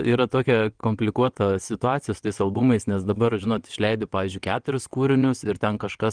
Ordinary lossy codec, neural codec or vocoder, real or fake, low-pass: Opus, 24 kbps; vocoder, 22.05 kHz, 80 mel bands, WaveNeXt; fake; 9.9 kHz